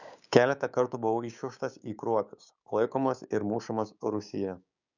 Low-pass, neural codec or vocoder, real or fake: 7.2 kHz; codec, 16 kHz, 6 kbps, DAC; fake